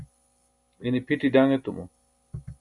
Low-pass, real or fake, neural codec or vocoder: 10.8 kHz; real; none